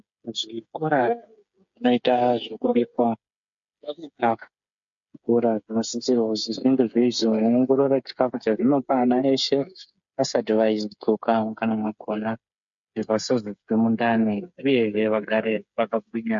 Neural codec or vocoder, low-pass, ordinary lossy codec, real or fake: codec, 16 kHz, 8 kbps, FreqCodec, smaller model; 7.2 kHz; MP3, 48 kbps; fake